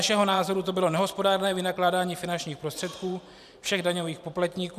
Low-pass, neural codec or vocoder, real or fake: 14.4 kHz; vocoder, 44.1 kHz, 128 mel bands every 512 samples, BigVGAN v2; fake